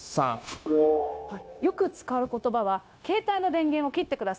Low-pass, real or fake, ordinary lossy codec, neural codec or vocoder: none; fake; none; codec, 16 kHz, 0.9 kbps, LongCat-Audio-Codec